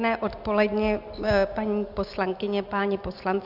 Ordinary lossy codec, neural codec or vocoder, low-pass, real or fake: Opus, 64 kbps; none; 5.4 kHz; real